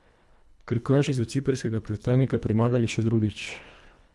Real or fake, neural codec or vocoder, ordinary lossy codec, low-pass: fake; codec, 24 kHz, 1.5 kbps, HILCodec; none; none